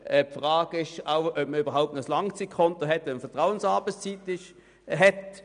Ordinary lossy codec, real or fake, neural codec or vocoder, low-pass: none; real; none; 9.9 kHz